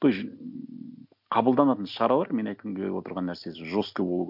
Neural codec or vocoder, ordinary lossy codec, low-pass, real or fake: none; none; 5.4 kHz; real